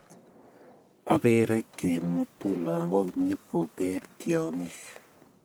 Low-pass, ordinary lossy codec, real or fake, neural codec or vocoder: none; none; fake; codec, 44.1 kHz, 1.7 kbps, Pupu-Codec